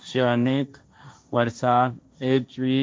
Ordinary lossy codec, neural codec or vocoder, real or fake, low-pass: none; codec, 16 kHz, 1.1 kbps, Voila-Tokenizer; fake; none